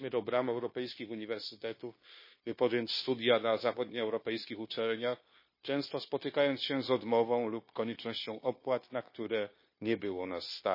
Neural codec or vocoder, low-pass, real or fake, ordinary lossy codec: codec, 16 kHz, 0.9 kbps, LongCat-Audio-Codec; 5.4 kHz; fake; MP3, 24 kbps